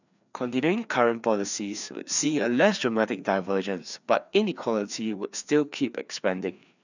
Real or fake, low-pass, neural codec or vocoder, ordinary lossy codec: fake; 7.2 kHz; codec, 16 kHz, 2 kbps, FreqCodec, larger model; none